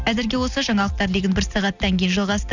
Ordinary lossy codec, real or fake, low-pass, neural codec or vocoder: none; real; 7.2 kHz; none